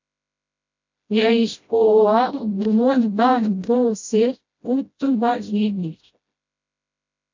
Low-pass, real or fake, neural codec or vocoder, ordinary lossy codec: 7.2 kHz; fake; codec, 16 kHz, 0.5 kbps, FreqCodec, smaller model; MP3, 64 kbps